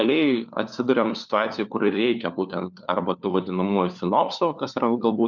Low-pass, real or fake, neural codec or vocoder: 7.2 kHz; fake; codec, 16 kHz, 4 kbps, FunCodec, trained on LibriTTS, 50 frames a second